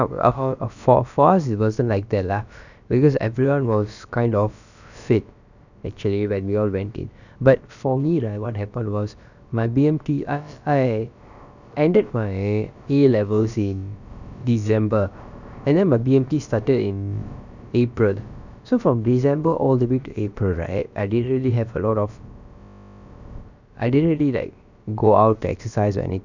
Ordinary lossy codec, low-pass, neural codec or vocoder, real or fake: none; 7.2 kHz; codec, 16 kHz, about 1 kbps, DyCAST, with the encoder's durations; fake